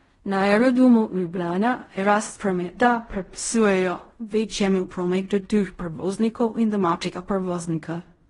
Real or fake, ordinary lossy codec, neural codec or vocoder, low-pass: fake; AAC, 32 kbps; codec, 16 kHz in and 24 kHz out, 0.4 kbps, LongCat-Audio-Codec, fine tuned four codebook decoder; 10.8 kHz